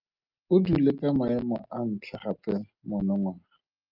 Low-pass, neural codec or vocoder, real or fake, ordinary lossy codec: 5.4 kHz; none; real; Opus, 24 kbps